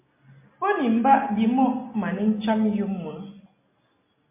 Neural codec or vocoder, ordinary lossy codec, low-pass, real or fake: none; MP3, 24 kbps; 3.6 kHz; real